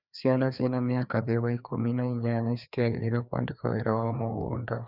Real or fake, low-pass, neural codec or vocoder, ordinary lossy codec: fake; 5.4 kHz; codec, 16 kHz, 2 kbps, FreqCodec, larger model; none